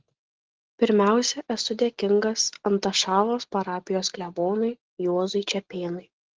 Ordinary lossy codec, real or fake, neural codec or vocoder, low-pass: Opus, 16 kbps; real; none; 7.2 kHz